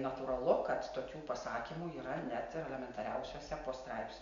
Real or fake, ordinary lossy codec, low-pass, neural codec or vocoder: real; MP3, 64 kbps; 7.2 kHz; none